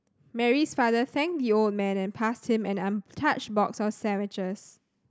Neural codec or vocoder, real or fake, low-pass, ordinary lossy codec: none; real; none; none